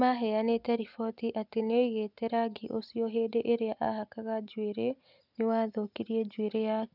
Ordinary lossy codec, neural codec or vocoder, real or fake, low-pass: none; none; real; 5.4 kHz